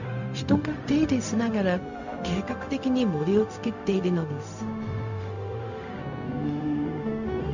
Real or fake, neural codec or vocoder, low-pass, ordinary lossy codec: fake; codec, 16 kHz, 0.4 kbps, LongCat-Audio-Codec; 7.2 kHz; none